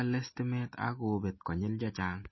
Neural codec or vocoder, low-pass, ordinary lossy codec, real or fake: none; 7.2 kHz; MP3, 24 kbps; real